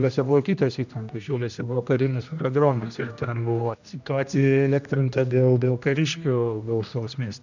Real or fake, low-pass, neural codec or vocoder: fake; 7.2 kHz; codec, 16 kHz, 1 kbps, X-Codec, HuBERT features, trained on general audio